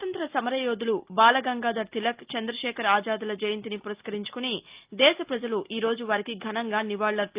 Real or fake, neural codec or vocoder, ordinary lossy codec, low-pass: real; none; Opus, 32 kbps; 3.6 kHz